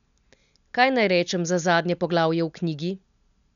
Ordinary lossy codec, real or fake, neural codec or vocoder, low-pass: none; real; none; 7.2 kHz